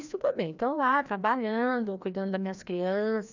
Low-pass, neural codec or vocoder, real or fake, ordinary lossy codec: 7.2 kHz; codec, 16 kHz, 1 kbps, FreqCodec, larger model; fake; none